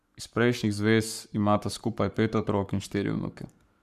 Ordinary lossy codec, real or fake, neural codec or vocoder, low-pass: none; fake; codec, 44.1 kHz, 7.8 kbps, Pupu-Codec; 14.4 kHz